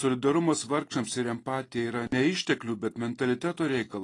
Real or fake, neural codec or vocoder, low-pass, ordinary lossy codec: real; none; 10.8 kHz; AAC, 32 kbps